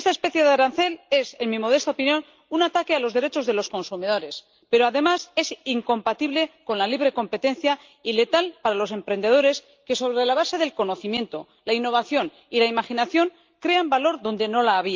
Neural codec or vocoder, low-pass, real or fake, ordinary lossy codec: none; 7.2 kHz; real; Opus, 24 kbps